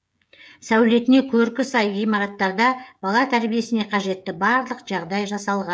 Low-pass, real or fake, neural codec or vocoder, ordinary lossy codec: none; fake; codec, 16 kHz, 16 kbps, FreqCodec, smaller model; none